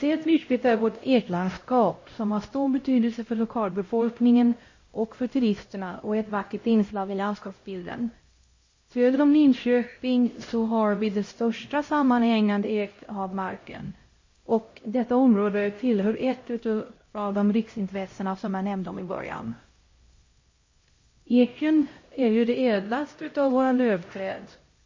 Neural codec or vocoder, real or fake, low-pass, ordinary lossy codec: codec, 16 kHz, 0.5 kbps, X-Codec, HuBERT features, trained on LibriSpeech; fake; 7.2 kHz; MP3, 32 kbps